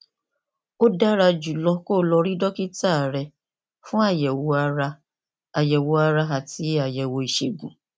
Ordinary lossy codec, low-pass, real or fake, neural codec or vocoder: none; none; real; none